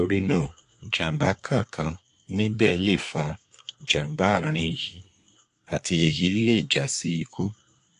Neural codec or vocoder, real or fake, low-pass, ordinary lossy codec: codec, 24 kHz, 1 kbps, SNAC; fake; 10.8 kHz; AAC, 64 kbps